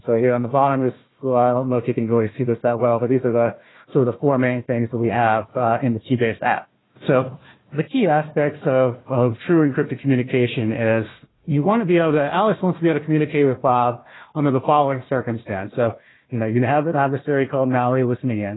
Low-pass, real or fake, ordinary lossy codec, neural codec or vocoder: 7.2 kHz; fake; AAC, 16 kbps; codec, 16 kHz, 1 kbps, FunCodec, trained on Chinese and English, 50 frames a second